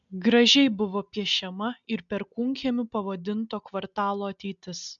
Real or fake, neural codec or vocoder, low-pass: real; none; 7.2 kHz